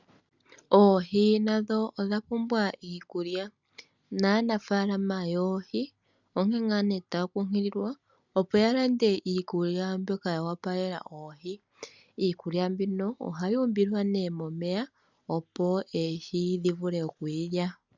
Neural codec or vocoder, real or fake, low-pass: none; real; 7.2 kHz